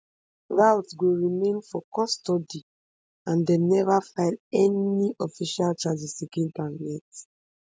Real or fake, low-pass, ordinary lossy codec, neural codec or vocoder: real; none; none; none